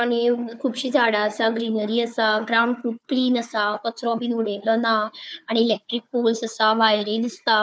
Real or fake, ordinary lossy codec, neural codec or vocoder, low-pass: fake; none; codec, 16 kHz, 4 kbps, FunCodec, trained on Chinese and English, 50 frames a second; none